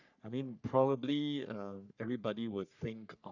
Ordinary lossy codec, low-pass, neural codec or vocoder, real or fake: none; 7.2 kHz; codec, 44.1 kHz, 3.4 kbps, Pupu-Codec; fake